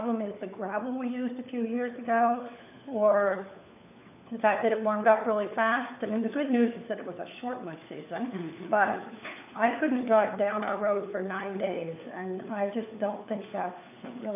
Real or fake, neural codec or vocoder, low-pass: fake; codec, 16 kHz, 4 kbps, FunCodec, trained on LibriTTS, 50 frames a second; 3.6 kHz